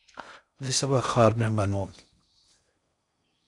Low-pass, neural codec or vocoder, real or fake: 10.8 kHz; codec, 16 kHz in and 24 kHz out, 0.6 kbps, FocalCodec, streaming, 4096 codes; fake